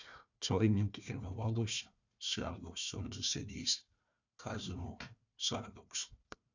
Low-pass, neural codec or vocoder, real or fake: 7.2 kHz; codec, 16 kHz, 1 kbps, FunCodec, trained on Chinese and English, 50 frames a second; fake